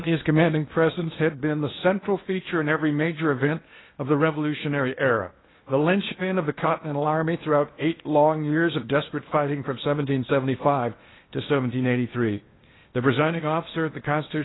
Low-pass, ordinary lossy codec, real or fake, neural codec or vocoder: 7.2 kHz; AAC, 16 kbps; fake; codec, 16 kHz in and 24 kHz out, 0.8 kbps, FocalCodec, streaming, 65536 codes